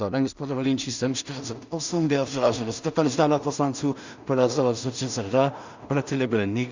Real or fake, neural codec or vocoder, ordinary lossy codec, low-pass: fake; codec, 16 kHz in and 24 kHz out, 0.4 kbps, LongCat-Audio-Codec, two codebook decoder; Opus, 64 kbps; 7.2 kHz